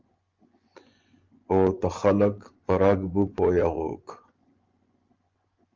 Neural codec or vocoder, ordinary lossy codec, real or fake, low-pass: vocoder, 24 kHz, 100 mel bands, Vocos; Opus, 32 kbps; fake; 7.2 kHz